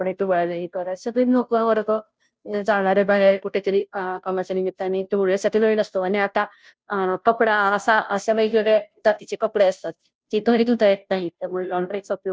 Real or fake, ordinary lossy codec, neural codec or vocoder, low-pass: fake; none; codec, 16 kHz, 0.5 kbps, FunCodec, trained on Chinese and English, 25 frames a second; none